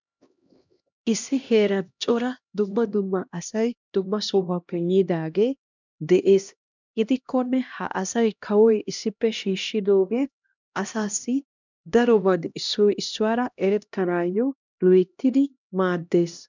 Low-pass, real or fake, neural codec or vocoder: 7.2 kHz; fake; codec, 16 kHz, 1 kbps, X-Codec, HuBERT features, trained on LibriSpeech